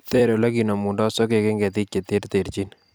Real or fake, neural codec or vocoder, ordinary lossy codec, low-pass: fake; vocoder, 44.1 kHz, 128 mel bands every 256 samples, BigVGAN v2; none; none